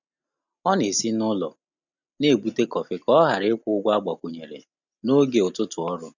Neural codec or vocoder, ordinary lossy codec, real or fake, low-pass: none; none; real; 7.2 kHz